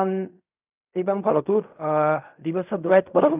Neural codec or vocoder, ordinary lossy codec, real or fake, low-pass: codec, 16 kHz in and 24 kHz out, 0.4 kbps, LongCat-Audio-Codec, fine tuned four codebook decoder; none; fake; 3.6 kHz